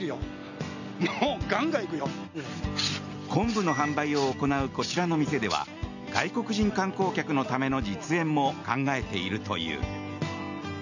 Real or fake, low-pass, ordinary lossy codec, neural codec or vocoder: real; 7.2 kHz; none; none